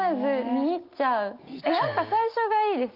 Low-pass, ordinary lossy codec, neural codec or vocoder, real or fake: 5.4 kHz; Opus, 24 kbps; none; real